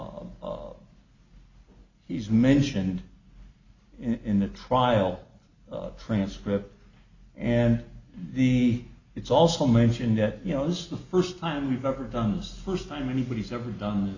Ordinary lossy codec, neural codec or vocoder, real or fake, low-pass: Opus, 64 kbps; none; real; 7.2 kHz